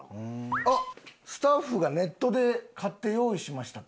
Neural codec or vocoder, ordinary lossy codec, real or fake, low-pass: none; none; real; none